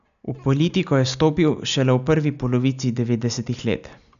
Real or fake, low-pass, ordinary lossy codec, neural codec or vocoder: real; 7.2 kHz; none; none